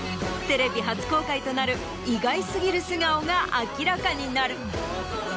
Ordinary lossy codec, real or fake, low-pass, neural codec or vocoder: none; real; none; none